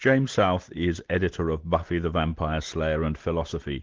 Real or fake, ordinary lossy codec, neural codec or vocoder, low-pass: real; Opus, 16 kbps; none; 7.2 kHz